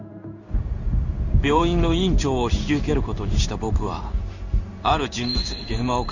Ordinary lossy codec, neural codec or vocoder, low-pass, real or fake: none; codec, 16 kHz in and 24 kHz out, 1 kbps, XY-Tokenizer; 7.2 kHz; fake